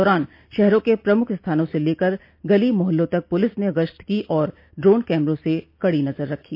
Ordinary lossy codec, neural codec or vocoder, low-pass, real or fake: AAC, 48 kbps; none; 5.4 kHz; real